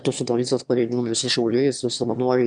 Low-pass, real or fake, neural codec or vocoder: 9.9 kHz; fake; autoencoder, 22.05 kHz, a latent of 192 numbers a frame, VITS, trained on one speaker